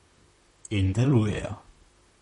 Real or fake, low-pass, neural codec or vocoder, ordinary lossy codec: fake; 19.8 kHz; vocoder, 44.1 kHz, 128 mel bands, Pupu-Vocoder; MP3, 48 kbps